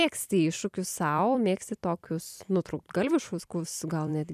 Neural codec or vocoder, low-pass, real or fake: vocoder, 44.1 kHz, 128 mel bands every 256 samples, BigVGAN v2; 14.4 kHz; fake